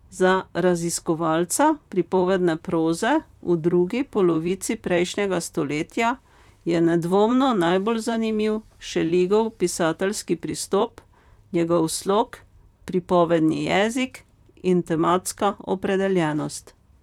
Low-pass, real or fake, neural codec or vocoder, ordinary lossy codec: 19.8 kHz; fake; vocoder, 44.1 kHz, 128 mel bands, Pupu-Vocoder; none